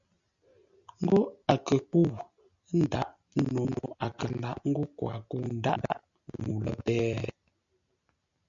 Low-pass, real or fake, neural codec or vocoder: 7.2 kHz; real; none